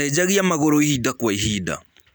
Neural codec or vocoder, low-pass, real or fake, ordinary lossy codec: none; none; real; none